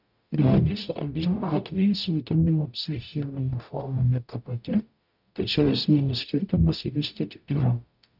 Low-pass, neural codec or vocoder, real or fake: 5.4 kHz; codec, 44.1 kHz, 0.9 kbps, DAC; fake